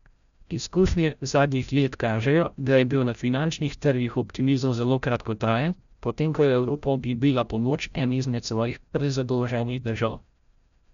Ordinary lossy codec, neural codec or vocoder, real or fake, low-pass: none; codec, 16 kHz, 0.5 kbps, FreqCodec, larger model; fake; 7.2 kHz